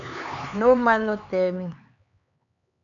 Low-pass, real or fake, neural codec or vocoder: 7.2 kHz; fake; codec, 16 kHz, 4 kbps, X-Codec, HuBERT features, trained on LibriSpeech